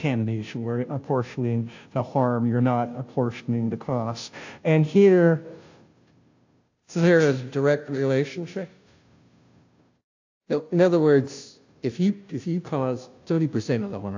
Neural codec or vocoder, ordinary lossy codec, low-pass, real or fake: codec, 16 kHz, 0.5 kbps, FunCodec, trained on Chinese and English, 25 frames a second; AAC, 48 kbps; 7.2 kHz; fake